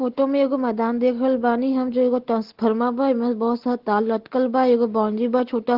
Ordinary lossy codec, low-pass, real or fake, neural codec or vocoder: Opus, 16 kbps; 5.4 kHz; real; none